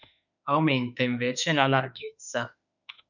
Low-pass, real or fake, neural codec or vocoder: 7.2 kHz; fake; autoencoder, 48 kHz, 32 numbers a frame, DAC-VAE, trained on Japanese speech